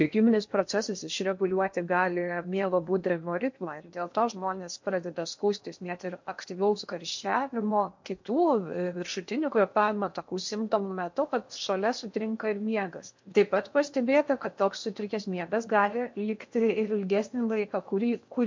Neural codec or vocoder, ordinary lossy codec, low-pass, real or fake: codec, 16 kHz in and 24 kHz out, 0.8 kbps, FocalCodec, streaming, 65536 codes; MP3, 48 kbps; 7.2 kHz; fake